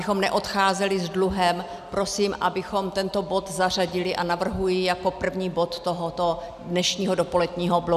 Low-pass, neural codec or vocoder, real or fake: 14.4 kHz; none; real